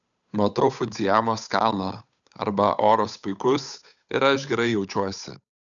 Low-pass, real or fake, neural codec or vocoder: 7.2 kHz; fake; codec, 16 kHz, 8 kbps, FunCodec, trained on Chinese and English, 25 frames a second